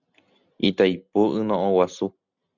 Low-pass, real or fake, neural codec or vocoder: 7.2 kHz; real; none